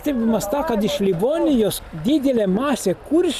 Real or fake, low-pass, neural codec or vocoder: real; 14.4 kHz; none